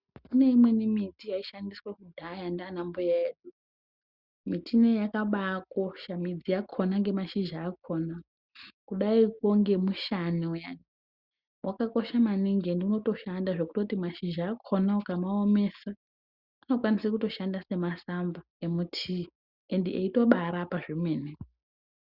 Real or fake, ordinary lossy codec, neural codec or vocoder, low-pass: real; Opus, 64 kbps; none; 5.4 kHz